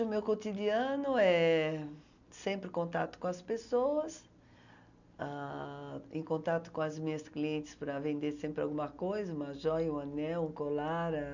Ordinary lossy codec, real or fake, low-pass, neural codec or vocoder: none; real; 7.2 kHz; none